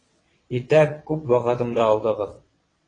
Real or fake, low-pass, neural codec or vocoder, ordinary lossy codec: fake; 9.9 kHz; vocoder, 22.05 kHz, 80 mel bands, WaveNeXt; AAC, 32 kbps